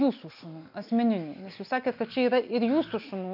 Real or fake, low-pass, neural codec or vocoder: real; 5.4 kHz; none